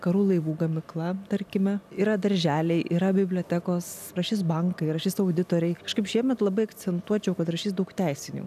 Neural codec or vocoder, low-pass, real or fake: none; 14.4 kHz; real